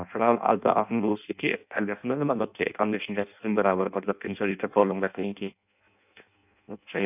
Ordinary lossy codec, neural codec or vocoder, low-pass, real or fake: none; codec, 16 kHz in and 24 kHz out, 0.6 kbps, FireRedTTS-2 codec; 3.6 kHz; fake